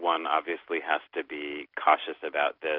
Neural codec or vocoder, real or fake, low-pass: none; real; 5.4 kHz